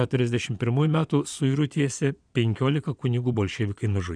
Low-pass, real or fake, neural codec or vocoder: 9.9 kHz; fake; vocoder, 22.05 kHz, 80 mel bands, Vocos